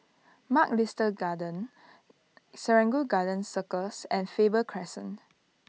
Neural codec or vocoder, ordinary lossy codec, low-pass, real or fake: none; none; none; real